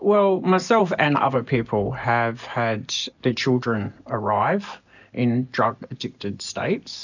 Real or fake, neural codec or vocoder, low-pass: real; none; 7.2 kHz